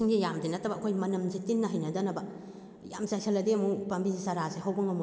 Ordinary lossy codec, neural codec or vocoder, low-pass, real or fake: none; none; none; real